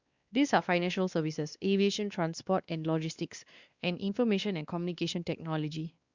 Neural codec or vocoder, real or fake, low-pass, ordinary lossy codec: codec, 16 kHz, 2 kbps, X-Codec, WavLM features, trained on Multilingual LibriSpeech; fake; 7.2 kHz; Opus, 64 kbps